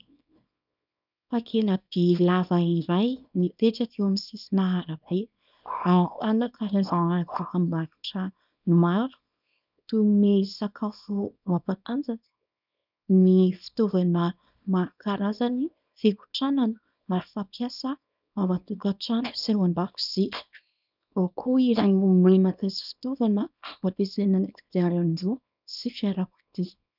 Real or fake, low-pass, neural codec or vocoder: fake; 5.4 kHz; codec, 24 kHz, 0.9 kbps, WavTokenizer, small release